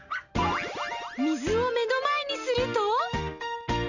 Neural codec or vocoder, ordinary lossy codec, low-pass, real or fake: none; none; 7.2 kHz; real